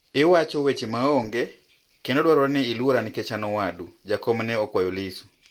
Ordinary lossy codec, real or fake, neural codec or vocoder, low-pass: Opus, 24 kbps; real; none; 19.8 kHz